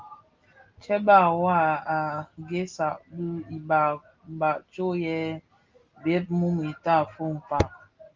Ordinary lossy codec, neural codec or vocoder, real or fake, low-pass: Opus, 32 kbps; none; real; 7.2 kHz